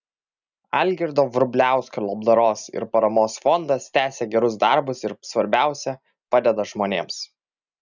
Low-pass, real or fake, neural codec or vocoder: 7.2 kHz; real; none